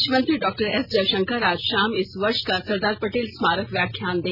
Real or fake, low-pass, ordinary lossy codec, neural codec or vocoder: real; 5.4 kHz; none; none